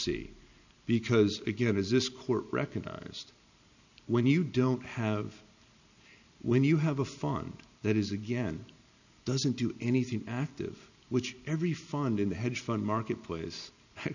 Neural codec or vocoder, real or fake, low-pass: none; real; 7.2 kHz